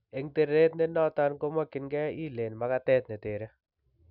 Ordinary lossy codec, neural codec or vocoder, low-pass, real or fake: none; none; 5.4 kHz; real